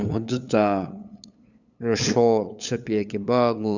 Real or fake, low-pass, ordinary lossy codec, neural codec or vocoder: fake; 7.2 kHz; none; codec, 16 kHz, 8 kbps, FunCodec, trained on LibriTTS, 25 frames a second